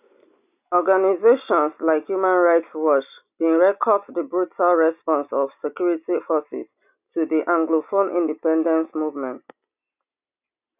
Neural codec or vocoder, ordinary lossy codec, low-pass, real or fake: none; none; 3.6 kHz; real